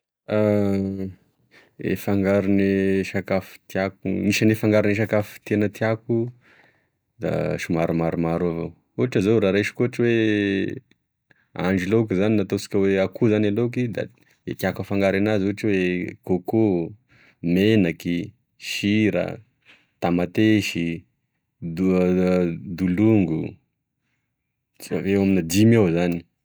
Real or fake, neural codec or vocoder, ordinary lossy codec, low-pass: real; none; none; none